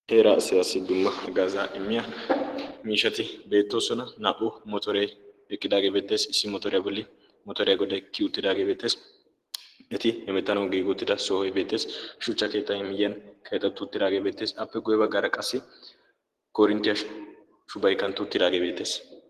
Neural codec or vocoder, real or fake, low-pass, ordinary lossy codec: none; real; 14.4 kHz; Opus, 16 kbps